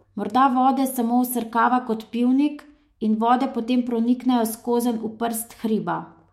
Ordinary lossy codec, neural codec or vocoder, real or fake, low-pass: MP3, 64 kbps; autoencoder, 48 kHz, 128 numbers a frame, DAC-VAE, trained on Japanese speech; fake; 19.8 kHz